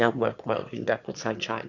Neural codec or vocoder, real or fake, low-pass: autoencoder, 22.05 kHz, a latent of 192 numbers a frame, VITS, trained on one speaker; fake; 7.2 kHz